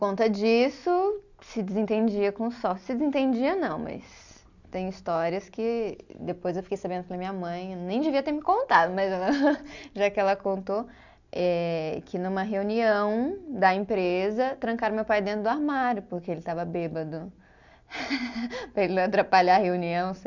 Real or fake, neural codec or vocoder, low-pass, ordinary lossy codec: real; none; 7.2 kHz; none